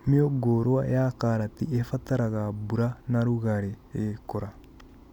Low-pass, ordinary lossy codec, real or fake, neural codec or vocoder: 19.8 kHz; none; real; none